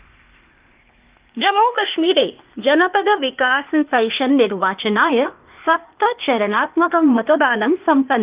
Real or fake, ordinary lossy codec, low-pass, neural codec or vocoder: fake; Opus, 24 kbps; 3.6 kHz; codec, 16 kHz, 0.8 kbps, ZipCodec